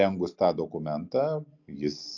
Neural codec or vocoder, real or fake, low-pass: none; real; 7.2 kHz